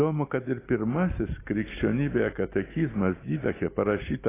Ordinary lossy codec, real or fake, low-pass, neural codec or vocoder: AAC, 16 kbps; real; 3.6 kHz; none